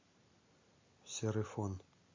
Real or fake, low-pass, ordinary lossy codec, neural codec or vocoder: real; 7.2 kHz; MP3, 32 kbps; none